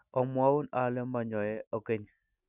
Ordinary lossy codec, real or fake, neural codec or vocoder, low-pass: none; real; none; 3.6 kHz